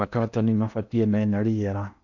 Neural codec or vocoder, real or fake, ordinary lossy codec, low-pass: codec, 16 kHz in and 24 kHz out, 0.6 kbps, FocalCodec, streaming, 4096 codes; fake; none; 7.2 kHz